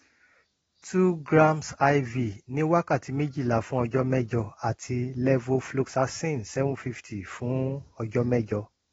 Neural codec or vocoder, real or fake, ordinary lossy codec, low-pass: none; real; AAC, 24 kbps; 9.9 kHz